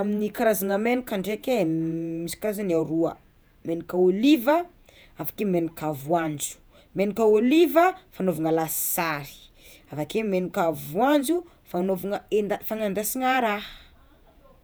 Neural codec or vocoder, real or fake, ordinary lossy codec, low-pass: vocoder, 48 kHz, 128 mel bands, Vocos; fake; none; none